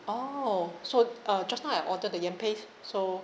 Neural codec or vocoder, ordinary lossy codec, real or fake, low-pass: none; none; real; none